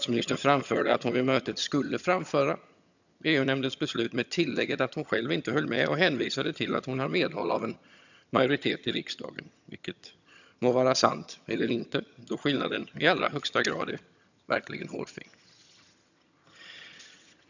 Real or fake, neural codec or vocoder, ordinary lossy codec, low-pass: fake; vocoder, 22.05 kHz, 80 mel bands, HiFi-GAN; none; 7.2 kHz